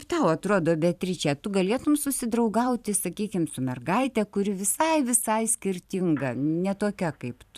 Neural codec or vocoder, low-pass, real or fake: vocoder, 44.1 kHz, 128 mel bands every 512 samples, BigVGAN v2; 14.4 kHz; fake